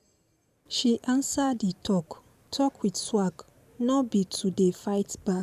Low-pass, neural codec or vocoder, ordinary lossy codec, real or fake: 14.4 kHz; vocoder, 44.1 kHz, 128 mel bands every 512 samples, BigVGAN v2; none; fake